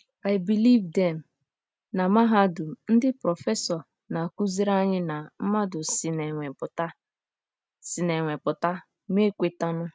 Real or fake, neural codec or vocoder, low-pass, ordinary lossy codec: real; none; none; none